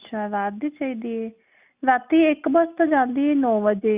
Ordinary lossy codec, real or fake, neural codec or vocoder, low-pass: Opus, 64 kbps; real; none; 3.6 kHz